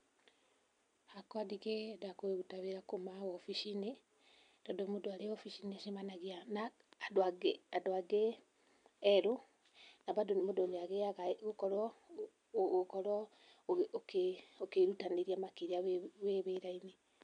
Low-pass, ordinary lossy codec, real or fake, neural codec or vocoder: 9.9 kHz; none; real; none